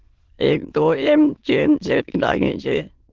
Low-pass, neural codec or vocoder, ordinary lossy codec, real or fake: 7.2 kHz; autoencoder, 22.05 kHz, a latent of 192 numbers a frame, VITS, trained on many speakers; Opus, 16 kbps; fake